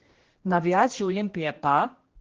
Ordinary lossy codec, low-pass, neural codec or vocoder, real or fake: Opus, 16 kbps; 7.2 kHz; codec, 16 kHz, 1 kbps, X-Codec, HuBERT features, trained on general audio; fake